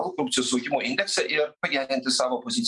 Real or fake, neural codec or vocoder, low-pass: real; none; 10.8 kHz